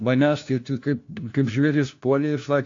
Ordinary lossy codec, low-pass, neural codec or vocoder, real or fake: AAC, 48 kbps; 7.2 kHz; codec, 16 kHz, 1 kbps, FunCodec, trained on LibriTTS, 50 frames a second; fake